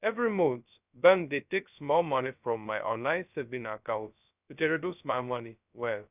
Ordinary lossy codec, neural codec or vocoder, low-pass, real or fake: none; codec, 16 kHz, 0.2 kbps, FocalCodec; 3.6 kHz; fake